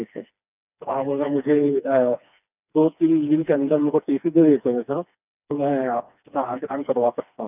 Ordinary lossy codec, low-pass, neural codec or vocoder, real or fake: none; 3.6 kHz; codec, 16 kHz, 2 kbps, FreqCodec, smaller model; fake